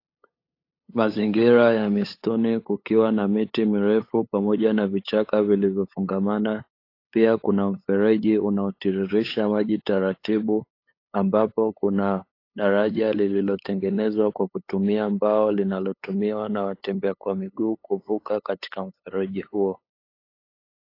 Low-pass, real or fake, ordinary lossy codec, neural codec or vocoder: 5.4 kHz; fake; AAC, 32 kbps; codec, 16 kHz, 8 kbps, FunCodec, trained on LibriTTS, 25 frames a second